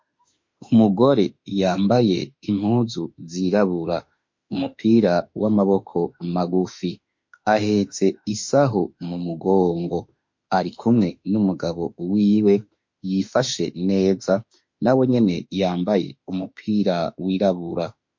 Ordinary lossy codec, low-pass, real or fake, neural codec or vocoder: MP3, 48 kbps; 7.2 kHz; fake; autoencoder, 48 kHz, 32 numbers a frame, DAC-VAE, trained on Japanese speech